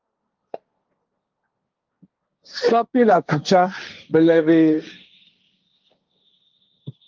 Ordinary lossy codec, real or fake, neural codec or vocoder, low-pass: Opus, 32 kbps; fake; codec, 16 kHz, 1.1 kbps, Voila-Tokenizer; 7.2 kHz